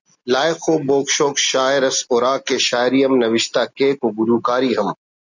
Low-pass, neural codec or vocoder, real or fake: 7.2 kHz; none; real